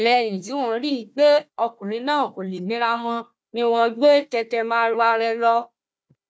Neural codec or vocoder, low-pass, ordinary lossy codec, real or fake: codec, 16 kHz, 1 kbps, FunCodec, trained on Chinese and English, 50 frames a second; none; none; fake